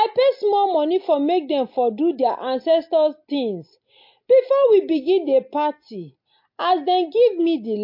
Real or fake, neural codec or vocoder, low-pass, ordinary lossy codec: real; none; 5.4 kHz; MP3, 32 kbps